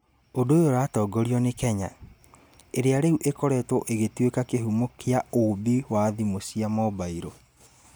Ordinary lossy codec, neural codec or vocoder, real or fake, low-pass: none; none; real; none